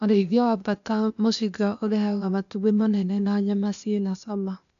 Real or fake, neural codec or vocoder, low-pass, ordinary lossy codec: fake; codec, 16 kHz, 0.8 kbps, ZipCodec; 7.2 kHz; none